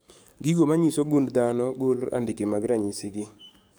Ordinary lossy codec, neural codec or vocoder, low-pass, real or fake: none; codec, 44.1 kHz, 7.8 kbps, DAC; none; fake